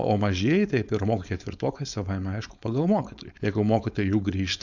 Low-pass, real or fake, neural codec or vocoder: 7.2 kHz; fake; codec, 16 kHz, 4.8 kbps, FACodec